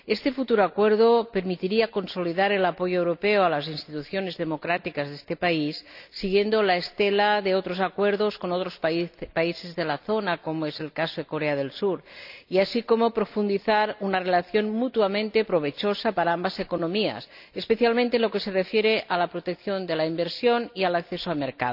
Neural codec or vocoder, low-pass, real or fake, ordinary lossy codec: none; 5.4 kHz; real; none